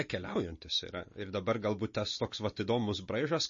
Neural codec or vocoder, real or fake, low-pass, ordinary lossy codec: none; real; 7.2 kHz; MP3, 32 kbps